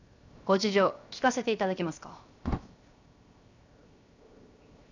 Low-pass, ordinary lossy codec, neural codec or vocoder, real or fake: 7.2 kHz; none; codec, 16 kHz, 0.7 kbps, FocalCodec; fake